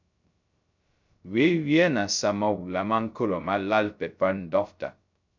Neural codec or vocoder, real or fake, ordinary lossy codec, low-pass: codec, 16 kHz, 0.2 kbps, FocalCodec; fake; MP3, 64 kbps; 7.2 kHz